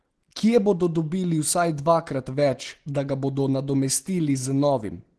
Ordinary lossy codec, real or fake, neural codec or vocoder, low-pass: Opus, 16 kbps; real; none; 10.8 kHz